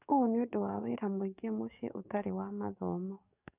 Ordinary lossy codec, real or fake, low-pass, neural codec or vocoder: none; fake; 3.6 kHz; codec, 44.1 kHz, 7.8 kbps, DAC